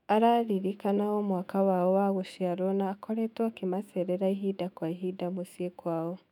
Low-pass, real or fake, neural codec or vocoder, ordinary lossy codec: 19.8 kHz; fake; autoencoder, 48 kHz, 128 numbers a frame, DAC-VAE, trained on Japanese speech; none